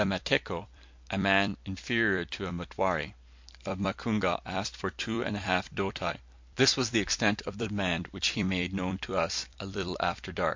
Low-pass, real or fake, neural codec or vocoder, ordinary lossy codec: 7.2 kHz; fake; vocoder, 44.1 kHz, 128 mel bands every 256 samples, BigVGAN v2; MP3, 48 kbps